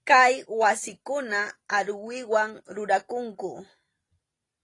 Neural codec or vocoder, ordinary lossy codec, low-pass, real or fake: none; AAC, 32 kbps; 10.8 kHz; real